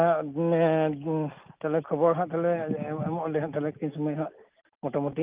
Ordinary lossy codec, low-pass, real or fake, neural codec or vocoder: Opus, 24 kbps; 3.6 kHz; real; none